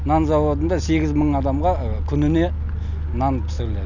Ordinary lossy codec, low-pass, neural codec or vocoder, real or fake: none; 7.2 kHz; none; real